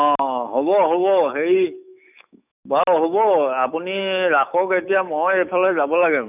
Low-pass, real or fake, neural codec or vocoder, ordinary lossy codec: 3.6 kHz; fake; autoencoder, 48 kHz, 128 numbers a frame, DAC-VAE, trained on Japanese speech; none